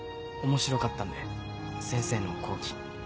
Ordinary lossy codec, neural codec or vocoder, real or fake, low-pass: none; none; real; none